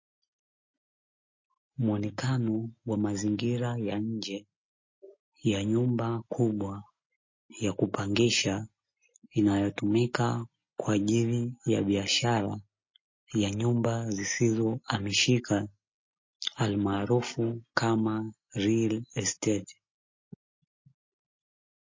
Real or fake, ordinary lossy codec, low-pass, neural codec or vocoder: real; MP3, 32 kbps; 7.2 kHz; none